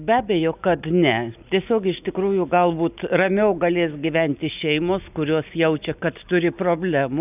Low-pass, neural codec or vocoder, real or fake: 3.6 kHz; none; real